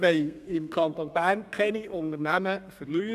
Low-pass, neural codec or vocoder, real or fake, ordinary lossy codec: 14.4 kHz; codec, 32 kHz, 1.9 kbps, SNAC; fake; none